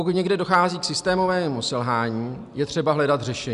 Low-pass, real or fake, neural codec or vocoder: 10.8 kHz; real; none